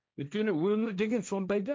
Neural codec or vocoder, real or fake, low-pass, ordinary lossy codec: codec, 16 kHz, 1.1 kbps, Voila-Tokenizer; fake; none; none